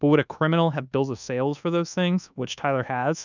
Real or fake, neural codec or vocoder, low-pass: fake; codec, 24 kHz, 1.2 kbps, DualCodec; 7.2 kHz